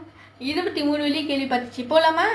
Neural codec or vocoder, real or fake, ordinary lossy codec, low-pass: none; real; none; none